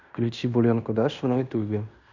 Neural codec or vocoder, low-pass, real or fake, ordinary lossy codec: codec, 16 kHz in and 24 kHz out, 0.9 kbps, LongCat-Audio-Codec, fine tuned four codebook decoder; 7.2 kHz; fake; none